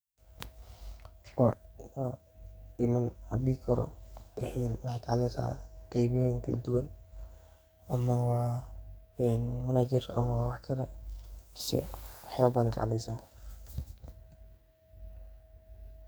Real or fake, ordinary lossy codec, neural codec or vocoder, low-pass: fake; none; codec, 44.1 kHz, 2.6 kbps, SNAC; none